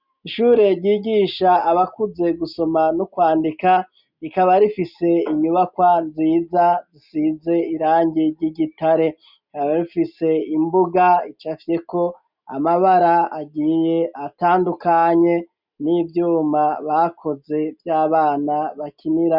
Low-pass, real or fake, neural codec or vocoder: 5.4 kHz; real; none